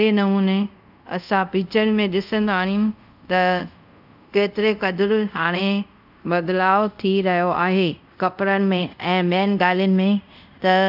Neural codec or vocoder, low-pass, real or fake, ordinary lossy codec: codec, 24 kHz, 0.5 kbps, DualCodec; 5.4 kHz; fake; none